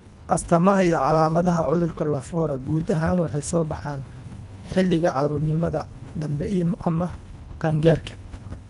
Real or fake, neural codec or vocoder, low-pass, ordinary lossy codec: fake; codec, 24 kHz, 1.5 kbps, HILCodec; 10.8 kHz; none